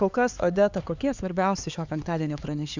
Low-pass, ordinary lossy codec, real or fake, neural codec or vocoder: 7.2 kHz; Opus, 64 kbps; fake; codec, 16 kHz, 4 kbps, X-Codec, HuBERT features, trained on LibriSpeech